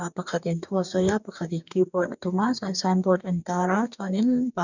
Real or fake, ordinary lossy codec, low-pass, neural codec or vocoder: fake; none; 7.2 kHz; codec, 44.1 kHz, 2.6 kbps, DAC